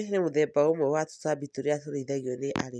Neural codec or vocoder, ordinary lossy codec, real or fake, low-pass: none; none; real; none